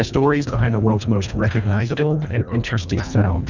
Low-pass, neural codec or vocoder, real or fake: 7.2 kHz; codec, 24 kHz, 1.5 kbps, HILCodec; fake